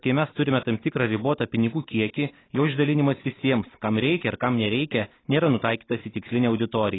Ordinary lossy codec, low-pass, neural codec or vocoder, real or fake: AAC, 16 kbps; 7.2 kHz; none; real